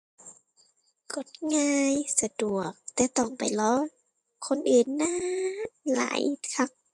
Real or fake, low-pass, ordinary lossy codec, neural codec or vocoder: fake; 10.8 kHz; MP3, 64 kbps; vocoder, 24 kHz, 100 mel bands, Vocos